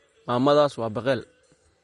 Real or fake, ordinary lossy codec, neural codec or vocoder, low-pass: real; MP3, 48 kbps; none; 19.8 kHz